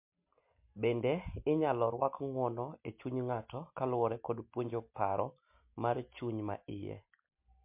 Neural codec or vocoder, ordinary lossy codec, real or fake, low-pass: none; MP3, 32 kbps; real; 3.6 kHz